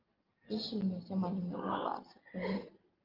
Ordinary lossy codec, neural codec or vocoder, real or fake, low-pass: Opus, 24 kbps; none; real; 5.4 kHz